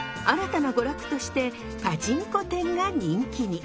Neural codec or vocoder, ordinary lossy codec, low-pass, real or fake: none; none; none; real